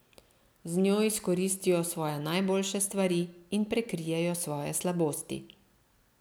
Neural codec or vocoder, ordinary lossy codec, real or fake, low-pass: none; none; real; none